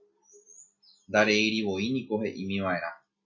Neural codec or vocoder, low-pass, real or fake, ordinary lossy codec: none; 7.2 kHz; real; MP3, 32 kbps